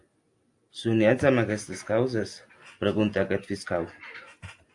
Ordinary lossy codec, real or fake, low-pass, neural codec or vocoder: AAC, 64 kbps; real; 10.8 kHz; none